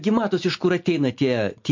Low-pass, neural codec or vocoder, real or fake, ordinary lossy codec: 7.2 kHz; none; real; MP3, 48 kbps